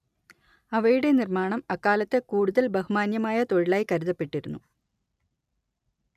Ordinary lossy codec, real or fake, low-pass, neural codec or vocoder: none; real; 14.4 kHz; none